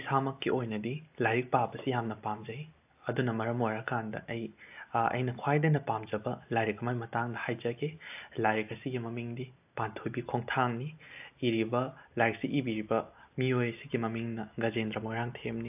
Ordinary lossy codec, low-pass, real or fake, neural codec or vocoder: AAC, 32 kbps; 3.6 kHz; real; none